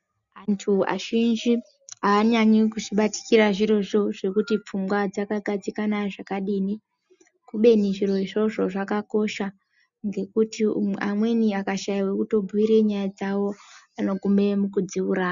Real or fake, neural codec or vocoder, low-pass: real; none; 7.2 kHz